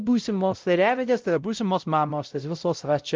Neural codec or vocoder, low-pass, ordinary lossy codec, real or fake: codec, 16 kHz, 0.5 kbps, X-Codec, WavLM features, trained on Multilingual LibriSpeech; 7.2 kHz; Opus, 32 kbps; fake